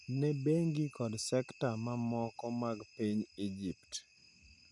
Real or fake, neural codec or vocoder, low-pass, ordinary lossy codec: real; none; 10.8 kHz; none